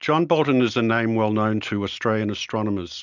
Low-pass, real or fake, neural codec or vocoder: 7.2 kHz; real; none